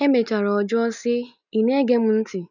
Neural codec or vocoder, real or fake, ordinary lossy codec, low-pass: none; real; none; 7.2 kHz